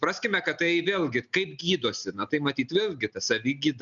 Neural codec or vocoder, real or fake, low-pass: none; real; 7.2 kHz